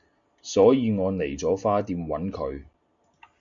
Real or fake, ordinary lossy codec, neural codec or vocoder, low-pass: real; MP3, 64 kbps; none; 7.2 kHz